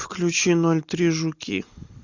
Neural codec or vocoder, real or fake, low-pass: none; real; 7.2 kHz